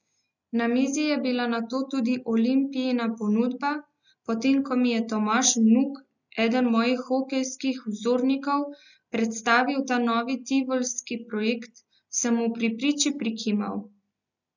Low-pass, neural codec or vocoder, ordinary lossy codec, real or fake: 7.2 kHz; none; none; real